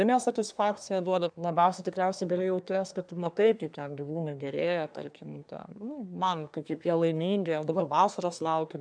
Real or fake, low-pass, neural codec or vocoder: fake; 9.9 kHz; codec, 24 kHz, 1 kbps, SNAC